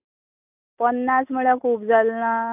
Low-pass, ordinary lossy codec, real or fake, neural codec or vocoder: 3.6 kHz; AAC, 32 kbps; real; none